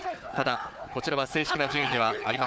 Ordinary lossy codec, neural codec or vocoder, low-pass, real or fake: none; codec, 16 kHz, 4 kbps, FunCodec, trained on Chinese and English, 50 frames a second; none; fake